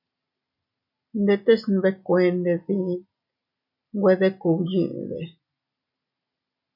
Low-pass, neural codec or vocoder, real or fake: 5.4 kHz; none; real